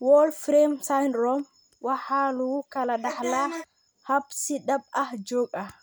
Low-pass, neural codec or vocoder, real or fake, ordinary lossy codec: none; none; real; none